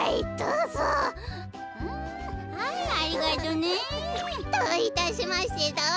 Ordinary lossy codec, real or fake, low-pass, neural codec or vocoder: none; real; none; none